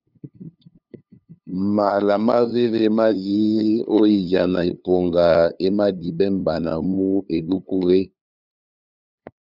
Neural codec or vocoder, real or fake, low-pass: codec, 16 kHz, 2 kbps, FunCodec, trained on LibriTTS, 25 frames a second; fake; 5.4 kHz